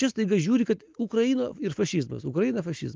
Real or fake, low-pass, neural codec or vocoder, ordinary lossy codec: real; 7.2 kHz; none; Opus, 24 kbps